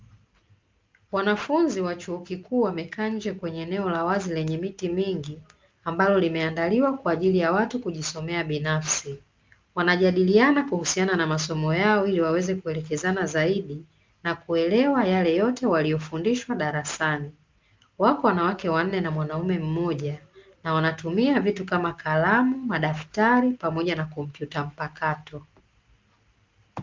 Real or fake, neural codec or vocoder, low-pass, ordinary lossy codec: real; none; 7.2 kHz; Opus, 24 kbps